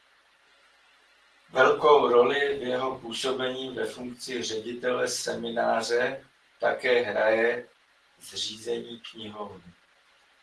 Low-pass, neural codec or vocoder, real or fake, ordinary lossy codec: 10.8 kHz; none; real; Opus, 16 kbps